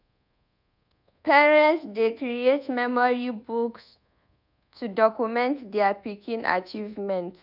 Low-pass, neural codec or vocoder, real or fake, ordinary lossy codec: 5.4 kHz; codec, 24 kHz, 1.2 kbps, DualCodec; fake; none